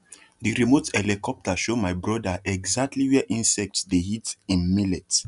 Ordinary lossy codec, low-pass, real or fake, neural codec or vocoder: none; 10.8 kHz; real; none